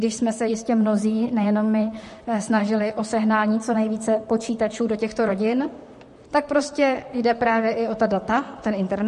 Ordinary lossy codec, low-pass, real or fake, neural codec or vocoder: MP3, 48 kbps; 14.4 kHz; fake; vocoder, 44.1 kHz, 128 mel bands, Pupu-Vocoder